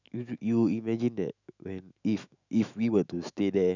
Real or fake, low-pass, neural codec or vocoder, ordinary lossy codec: fake; 7.2 kHz; autoencoder, 48 kHz, 128 numbers a frame, DAC-VAE, trained on Japanese speech; none